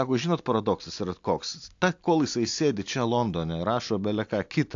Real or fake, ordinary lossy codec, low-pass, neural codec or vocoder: real; AAC, 48 kbps; 7.2 kHz; none